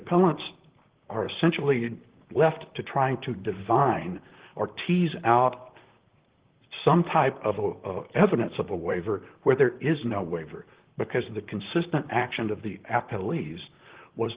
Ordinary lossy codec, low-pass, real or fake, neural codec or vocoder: Opus, 16 kbps; 3.6 kHz; fake; vocoder, 44.1 kHz, 128 mel bands, Pupu-Vocoder